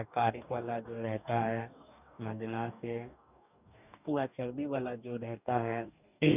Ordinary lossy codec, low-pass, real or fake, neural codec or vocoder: none; 3.6 kHz; fake; codec, 44.1 kHz, 2.6 kbps, DAC